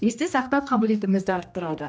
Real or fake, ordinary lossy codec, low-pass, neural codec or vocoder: fake; none; none; codec, 16 kHz, 1 kbps, X-Codec, HuBERT features, trained on general audio